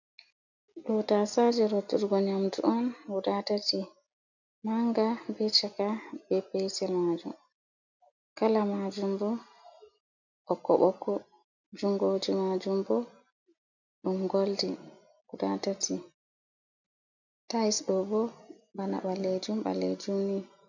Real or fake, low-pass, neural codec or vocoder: real; 7.2 kHz; none